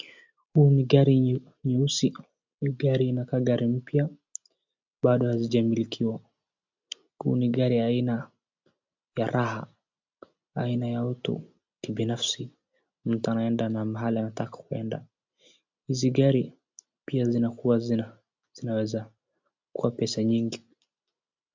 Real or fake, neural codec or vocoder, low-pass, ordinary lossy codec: real; none; 7.2 kHz; MP3, 64 kbps